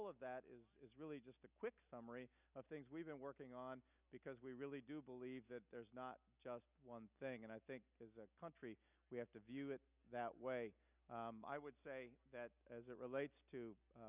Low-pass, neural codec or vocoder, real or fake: 3.6 kHz; none; real